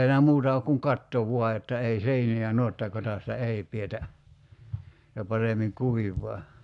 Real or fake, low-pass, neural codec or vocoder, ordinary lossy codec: fake; 10.8 kHz; codec, 44.1 kHz, 7.8 kbps, Pupu-Codec; none